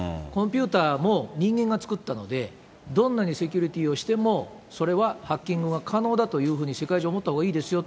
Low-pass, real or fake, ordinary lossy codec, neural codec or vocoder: none; real; none; none